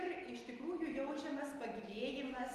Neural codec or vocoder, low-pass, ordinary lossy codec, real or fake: none; 14.4 kHz; Opus, 32 kbps; real